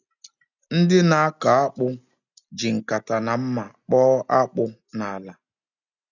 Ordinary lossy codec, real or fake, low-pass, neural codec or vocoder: none; real; 7.2 kHz; none